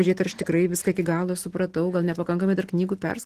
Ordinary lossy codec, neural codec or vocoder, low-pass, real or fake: Opus, 16 kbps; none; 14.4 kHz; real